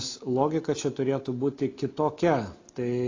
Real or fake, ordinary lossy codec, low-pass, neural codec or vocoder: real; AAC, 32 kbps; 7.2 kHz; none